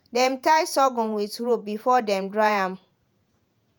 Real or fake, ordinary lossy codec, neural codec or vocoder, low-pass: fake; none; vocoder, 48 kHz, 128 mel bands, Vocos; none